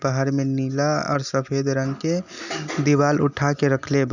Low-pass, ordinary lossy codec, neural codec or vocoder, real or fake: 7.2 kHz; none; none; real